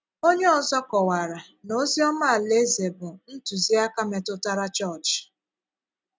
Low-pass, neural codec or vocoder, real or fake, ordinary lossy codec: none; none; real; none